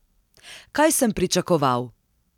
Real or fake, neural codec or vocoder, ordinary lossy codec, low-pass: real; none; none; 19.8 kHz